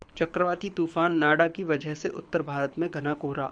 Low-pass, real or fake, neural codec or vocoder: 9.9 kHz; fake; codec, 24 kHz, 6 kbps, HILCodec